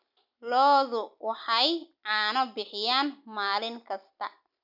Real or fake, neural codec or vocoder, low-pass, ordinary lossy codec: real; none; 5.4 kHz; none